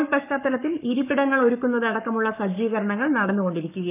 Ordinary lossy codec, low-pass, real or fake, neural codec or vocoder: none; 3.6 kHz; fake; codec, 44.1 kHz, 7.8 kbps, Pupu-Codec